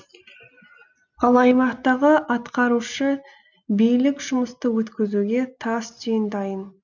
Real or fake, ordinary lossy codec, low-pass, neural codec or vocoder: real; none; 7.2 kHz; none